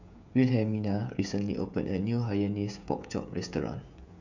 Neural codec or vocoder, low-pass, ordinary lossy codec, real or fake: codec, 16 kHz, 16 kbps, FreqCodec, smaller model; 7.2 kHz; none; fake